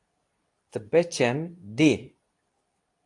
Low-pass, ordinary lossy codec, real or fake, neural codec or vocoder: 10.8 kHz; AAC, 64 kbps; fake; codec, 24 kHz, 0.9 kbps, WavTokenizer, medium speech release version 2